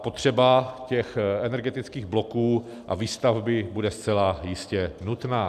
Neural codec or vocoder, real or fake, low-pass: none; real; 14.4 kHz